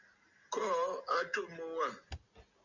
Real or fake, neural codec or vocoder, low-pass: real; none; 7.2 kHz